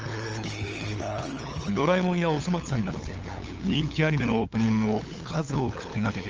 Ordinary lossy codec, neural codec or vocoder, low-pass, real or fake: Opus, 24 kbps; codec, 16 kHz, 8 kbps, FunCodec, trained on LibriTTS, 25 frames a second; 7.2 kHz; fake